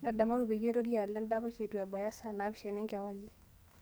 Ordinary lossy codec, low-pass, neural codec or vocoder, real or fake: none; none; codec, 44.1 kHz, 2.6 kbps, SNAC; fake